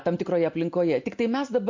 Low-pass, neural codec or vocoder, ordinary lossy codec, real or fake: 7.2 kHz; none; MP3, 48 kbps; real